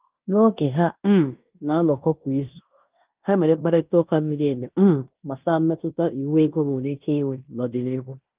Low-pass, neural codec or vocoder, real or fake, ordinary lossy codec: 3.6 kHz; codec, 16 kHz in and 24 kHz out, 0.9 kbps, LongCat-Audio-Codec, fine tuned four codebook decoder; fake; Opus, 32 kbps